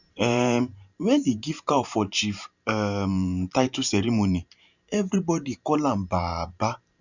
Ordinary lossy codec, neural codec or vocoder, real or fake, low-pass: none; vocoder, 44.1 kHz, 128 mel bands every 512 samples, BigVGAN v2; fake; 7.2 kHz